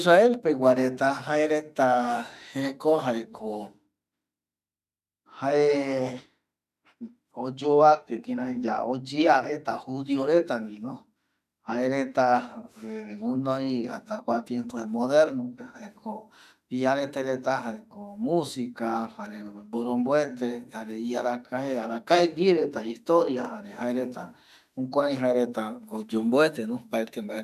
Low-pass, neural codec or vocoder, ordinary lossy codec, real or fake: 14.4 kHz; autoencoder, 48 kHz, 32 numbers a frame, DAC-VAE, trained on Japanese speech; none; fake